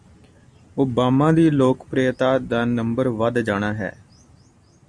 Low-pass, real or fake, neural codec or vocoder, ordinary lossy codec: 9.9 kHz; real; none; Opus, 64 kbps